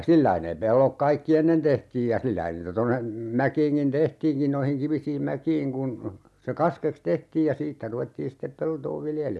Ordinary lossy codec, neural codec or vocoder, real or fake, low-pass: none; none; real; none